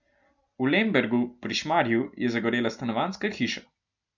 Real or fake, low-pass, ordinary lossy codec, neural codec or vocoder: real; 7.2 kHz; none; none